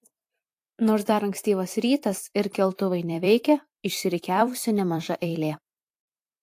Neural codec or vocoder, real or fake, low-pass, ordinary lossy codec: vocoder, 44.1 kHz, 128 mel bands every 256 samples, BigVGAN v2; fake; 14.4 kHz; AAC, 64 kbps